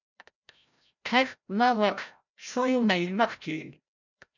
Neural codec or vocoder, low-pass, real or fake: codec, 16 kHz, 0.5 kbps, FreqCodec, larger model; 7.2 kHz; fake